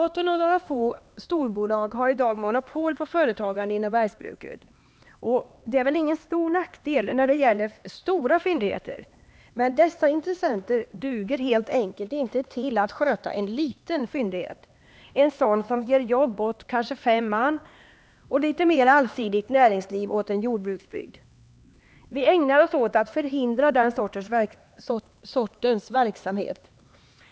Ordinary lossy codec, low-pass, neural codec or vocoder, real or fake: none; none; codec, 16 kHz, 2 kbps, X-Codec, HuBERT features, trained on LibriSpeech; fake